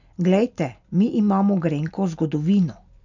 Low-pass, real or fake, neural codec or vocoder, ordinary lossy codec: 7.2 kHz; real; none; none